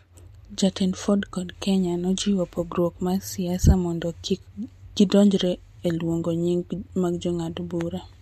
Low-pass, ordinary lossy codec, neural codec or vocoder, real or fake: 14.4 kHz; MP3, 64 kbps; none; real